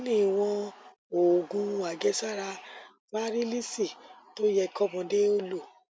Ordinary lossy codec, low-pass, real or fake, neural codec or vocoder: none; none; real; none